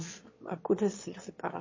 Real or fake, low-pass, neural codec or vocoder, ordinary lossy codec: fake; 7.2 kHz; autoencoder, 22.05 kHz, a latent of 192 numbers a frame, VITS, trained on one speaker; MP3, 32 kbps